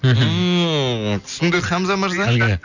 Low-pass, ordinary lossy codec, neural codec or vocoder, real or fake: 7.2 kHz; none; none; real